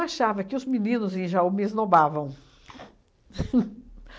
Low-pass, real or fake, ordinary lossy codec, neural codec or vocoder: none; real; none; none